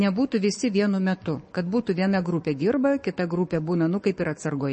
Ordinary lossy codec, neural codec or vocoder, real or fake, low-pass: MP3, 32 kbps; codec, 44.1 kHz, 7.8 kbps, Pupu-Codec; fake; 10.8 kHz